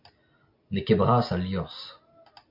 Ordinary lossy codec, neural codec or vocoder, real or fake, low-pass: MP3, 48 kbps; none; real; 5.4 kHz